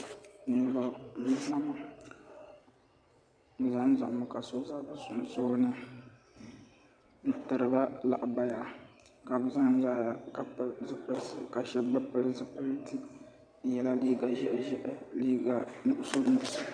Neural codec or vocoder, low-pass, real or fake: vocoder, 22.05 kHz, 80 mel bands, WaveNeXt; 9.9 kHz; fake